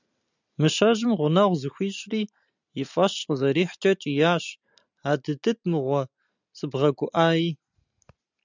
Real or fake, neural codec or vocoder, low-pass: real; none; 7.2 kHz